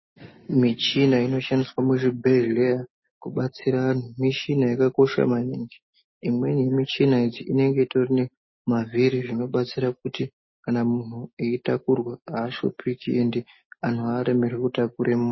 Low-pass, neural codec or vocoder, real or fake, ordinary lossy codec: 7.2 kHz; none; real; MP3, 24 kbps